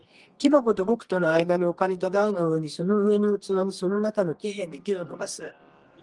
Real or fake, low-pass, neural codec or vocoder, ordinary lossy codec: fake; 10.8 kHz; codec, 24 kHz, 0.9 kbps, WavTokenizer, medium music audio release; Opus, 24 kbps